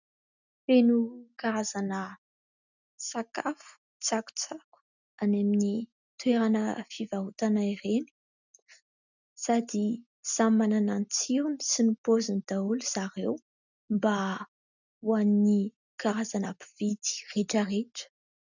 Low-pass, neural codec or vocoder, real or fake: 7.2 kHz; none; real